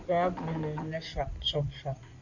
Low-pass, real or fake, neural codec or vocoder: 7.2 kHz; fake; codec, 16 kHz in and 24 kHz out, 2.2 kbps, FireRedTTS-2 codec